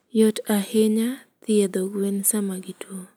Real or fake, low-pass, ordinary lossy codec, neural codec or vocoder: real; none; none; none